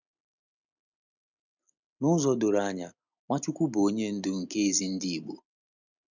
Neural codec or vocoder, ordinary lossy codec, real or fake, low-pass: none; none; real; 7.2 kHz